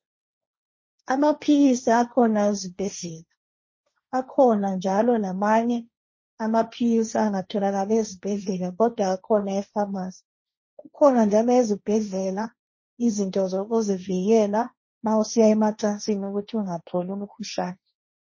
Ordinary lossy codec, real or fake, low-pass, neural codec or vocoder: MP3, 32 kbps; fake; 7.2 kHz; codec, 16 kHz, 1.1 kbps, Voila-Tokenizer